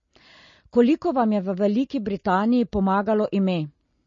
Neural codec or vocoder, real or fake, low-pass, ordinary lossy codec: none; real; 7.2 kHz; MP3, 32 kbps